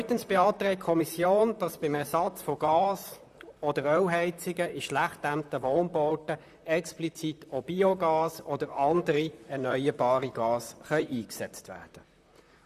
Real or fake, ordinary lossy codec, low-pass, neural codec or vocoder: fake; none; 14.4 kHz; vocoder, 44.1 kHz, 128 mel bands, Pupu-Vocoder